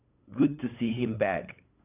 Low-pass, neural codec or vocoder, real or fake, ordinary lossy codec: 3.6 kHz; codec, 16 kHz, 8 kbps, FunCodec, trained on LibriTTS, 25 frames a second; fake; none